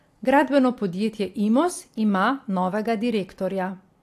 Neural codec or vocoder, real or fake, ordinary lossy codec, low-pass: none; real; AAC, 64 kbps; 14.4 kHz